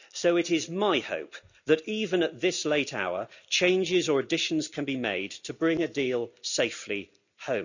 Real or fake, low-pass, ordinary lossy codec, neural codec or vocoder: real; 7.2 kHz; none; none